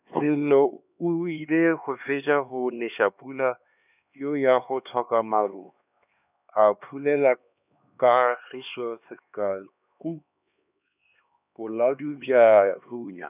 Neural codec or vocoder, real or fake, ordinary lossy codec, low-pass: codec, 16 kHz, 2 kbps, X-Codec, HuBERT features, trained on LibriSpeech; fake; none; 3.6 kHz